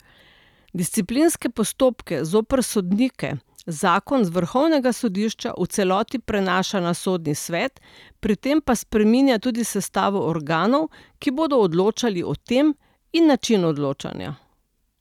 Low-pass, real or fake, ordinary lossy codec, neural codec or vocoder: 19.8 kHz; real; none; none